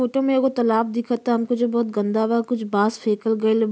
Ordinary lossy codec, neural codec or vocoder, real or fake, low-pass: none; none; real; none